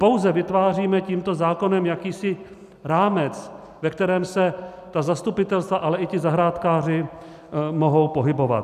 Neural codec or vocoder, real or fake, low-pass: none; real; 14.4 kHz